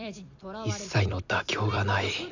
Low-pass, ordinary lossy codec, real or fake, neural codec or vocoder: 7.2 kHz; none; fake; vocoder, 44.1 kHz, 128 mel bands every 256 samples, BigVGAN v2